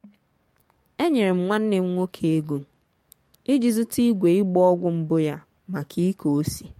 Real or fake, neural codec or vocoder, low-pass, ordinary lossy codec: fake; codec, 44.1 kHz, 7.8 kbps, DAC; 19.8 kHz; MP3, 64 kbps